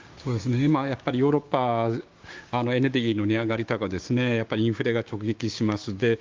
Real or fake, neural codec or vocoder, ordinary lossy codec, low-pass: fake; codec, 16 kHz, 6 kbps, DAC; Opus, 32 kbps; 7.2 kHz